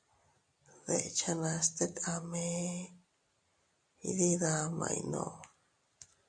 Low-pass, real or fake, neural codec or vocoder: 9.9 kHz; real; none